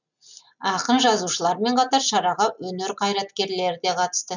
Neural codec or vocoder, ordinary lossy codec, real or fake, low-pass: none; none; real; 7.2 kHz